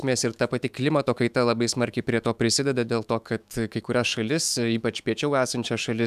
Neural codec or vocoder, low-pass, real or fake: autoencoder, 48 kHz, 128 numbers a frame, DAC-VAE, trained on Japanese speech; 14.4 kHz; fake